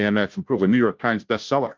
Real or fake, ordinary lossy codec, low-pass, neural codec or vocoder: fake; Opus, 24 kbps; 7.2 kHz; codec, 16 kHz, 0.5 kbps, FunCodec, trained on Chinese and English, 25 frames a second